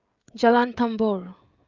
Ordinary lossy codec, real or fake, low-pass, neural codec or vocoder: Opus, 32 kbps; fake; 7.2 kHz; codec, 16 kHz, 16 kbps, FreqCodec, smaller model